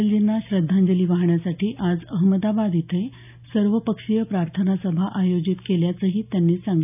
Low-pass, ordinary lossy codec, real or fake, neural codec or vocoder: 3.6 kHz; none; real; none